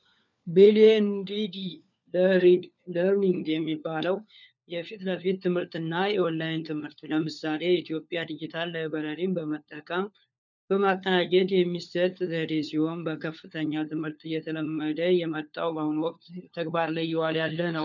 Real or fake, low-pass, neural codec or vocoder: fake; 7.2 kHz; codec, 16 kHz, 4 kbps, FunCodec, trained on LibriTTS, 50 frames a second